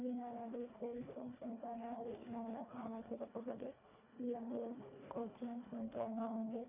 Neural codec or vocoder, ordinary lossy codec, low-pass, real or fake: codec, 24 kHz, 1.5 kbps, HILCodec; none; 3.6 kHz; fake